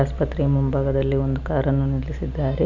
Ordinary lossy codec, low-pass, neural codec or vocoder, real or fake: none; 7.2 kHz; none; real